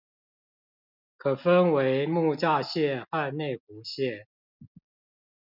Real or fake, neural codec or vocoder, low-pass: real; none; 5.4 kHz